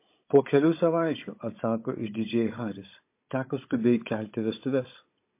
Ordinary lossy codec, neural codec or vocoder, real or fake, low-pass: MP3, 24 kbps; codec, 16 kHz, 16 kbps, FunCodec, trained on Chinese and English, 50 frames a second; fake; 3.6 kHz